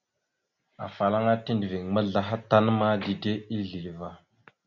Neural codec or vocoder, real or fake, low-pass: none; real; 7.2 kHz